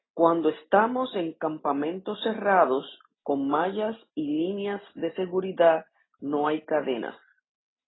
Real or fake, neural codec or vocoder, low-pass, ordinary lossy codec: real; none; 7.2 kHz; AAC, 16 kbps